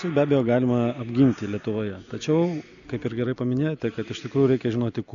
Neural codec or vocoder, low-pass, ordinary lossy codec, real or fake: none; 7.2 kHz; MP3, 96 kbps; real